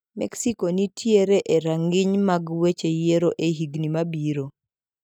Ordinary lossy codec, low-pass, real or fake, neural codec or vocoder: none; 19.8 kHz; real; none